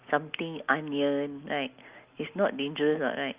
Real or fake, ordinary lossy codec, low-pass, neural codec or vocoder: real; Opus, 32 kbps; 3.6 kHz; none